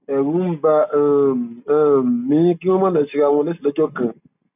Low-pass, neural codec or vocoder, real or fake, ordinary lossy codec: 3.6 kHz; none; real; none